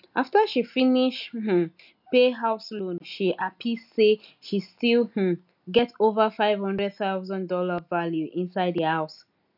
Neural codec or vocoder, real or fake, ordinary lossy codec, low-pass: none; real; none; 5.4 kHz